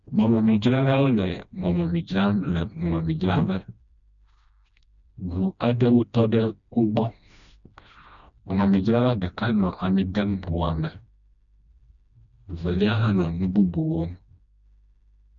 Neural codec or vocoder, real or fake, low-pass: codec, 16 kHz, 1 kbps, FreqCodec, smaller model; fake; 7.2 kHz